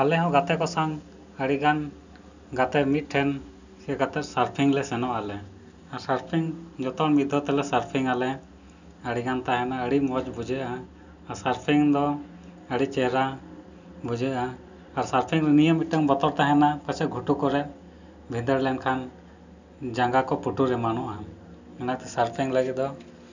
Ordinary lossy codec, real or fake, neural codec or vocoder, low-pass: none; real; none; 7.2 kHz